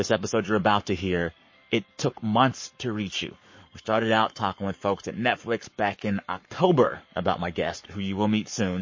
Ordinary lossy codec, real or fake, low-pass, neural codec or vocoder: MP3, 32 kbps; fake; 7.2 kHz; codec, 44.1 kHz, 7.8 kbps, Pupu-Codec